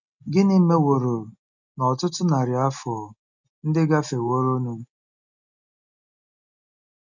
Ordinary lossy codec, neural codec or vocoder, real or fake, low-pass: none; none; real; 7.2 kHz